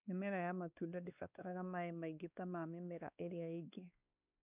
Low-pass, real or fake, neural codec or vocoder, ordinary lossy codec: 3.6 kHz; fake; codec, 16 kHz, 2 kbps, X-Codec, WavLM features, trained on Multilingual LibriSpeech; none